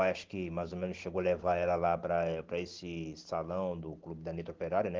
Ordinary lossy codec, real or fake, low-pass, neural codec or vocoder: Opus, 16 kbps; real; 7.2 kHz; none